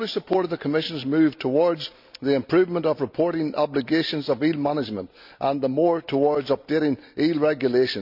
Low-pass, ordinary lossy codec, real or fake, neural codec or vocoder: 5.4 kHz; none; real; none